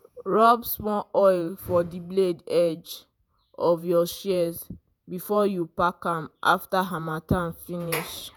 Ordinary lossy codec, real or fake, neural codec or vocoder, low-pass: none; fake; vocoder, 48 kHz, 128 mel bands, Vocos; none